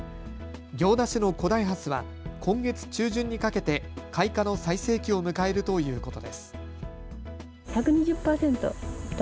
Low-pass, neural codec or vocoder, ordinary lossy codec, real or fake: none; none; none; real